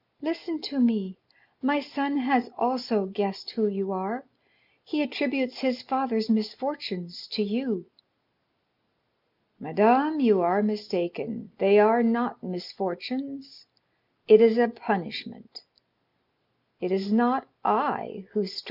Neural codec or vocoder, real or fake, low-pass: none; real; 5.4 kHz